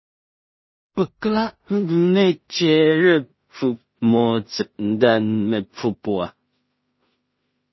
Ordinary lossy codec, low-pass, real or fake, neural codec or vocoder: MP3, 24 kbps; 7.2 kHz; fake; codec, 16 kHz in and 24 kHz out, 0.4 kbps, LongCat-Audio-Codec, two codebook decoder